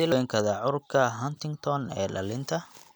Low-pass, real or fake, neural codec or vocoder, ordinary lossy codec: none; real; none; none